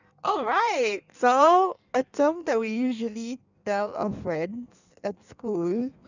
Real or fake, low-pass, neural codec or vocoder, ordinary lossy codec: fake; 7.2 kHz; codec, 16 kHz in and 24 kHz out, 1.1 kbps, FireRedTTS-2 codec; none